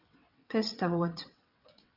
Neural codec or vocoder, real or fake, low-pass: vocoder, 22.05 kHz, 80 mel bands, WaveNeXt; fake; 5.4 kHz